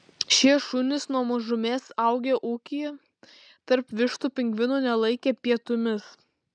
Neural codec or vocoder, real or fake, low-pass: none; real; 9.9 kHz